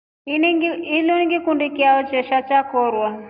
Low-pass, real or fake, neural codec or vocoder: 5.4 kHz; real; none